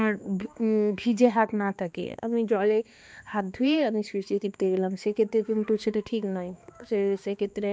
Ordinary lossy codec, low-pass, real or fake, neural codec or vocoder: none; none; fake; codec, 16 kHz, 2 kbps, X-Codec, HuBERT features, trained on balanced general audio